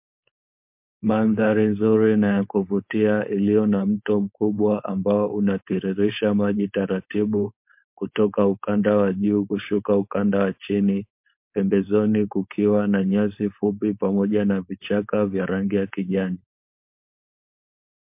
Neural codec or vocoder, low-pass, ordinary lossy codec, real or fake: codec, 16 kHz, 4.8 kbps, FACodec; 3.6 kHz; MP3, 32 kbps; fake